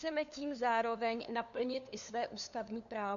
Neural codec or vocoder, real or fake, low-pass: codec, 16 kHz, 2 kbps, FunCodec, trained on LibriTTS, 25 frames a second; fake; 7.2 kHz